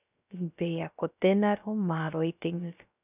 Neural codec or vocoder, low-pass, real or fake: codec, 16 kHz, 0.3 kbps, FocalCodec; 3.6 kHz; fake